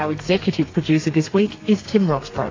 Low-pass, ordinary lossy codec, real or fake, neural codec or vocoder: 7.2 kHz; AAC, 32 kbps; fake; codec, 32 kHz, 1.9 kbps, SNAC